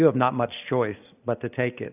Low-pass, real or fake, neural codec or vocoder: 3.6 kHz; real; none